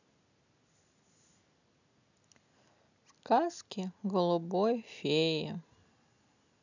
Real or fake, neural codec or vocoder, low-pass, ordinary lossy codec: real; none; 7.2 kHz; none